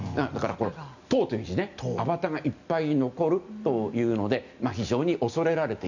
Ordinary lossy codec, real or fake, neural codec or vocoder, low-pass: none; real; none; 7.2 kHz